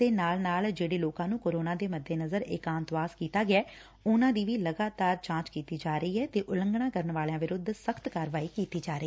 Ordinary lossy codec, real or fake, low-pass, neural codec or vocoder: none; real; none; none